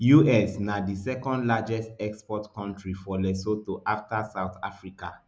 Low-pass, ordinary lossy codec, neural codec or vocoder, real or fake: none; none; none; real